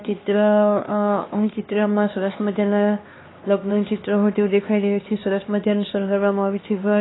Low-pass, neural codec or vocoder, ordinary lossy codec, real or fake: 7.2 kHz; codec, 16 kHz, 2 kbps, X-Codec, HuBERT features, trained on LibriSpeech; AAC, 16 kbps; fake